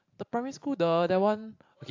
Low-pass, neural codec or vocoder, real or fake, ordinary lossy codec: 7.2 kHz; vocoder, 44.1 kHz, 128 mel bands every 512 samples, BigVGAN v2; fake; AAC, 48 kbps